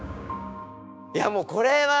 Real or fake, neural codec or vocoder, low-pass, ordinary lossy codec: fake; codec, 16 kHz, 6 kbps, DAC; none; none